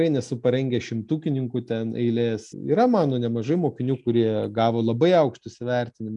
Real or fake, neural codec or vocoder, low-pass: real; none; 10.8 kHz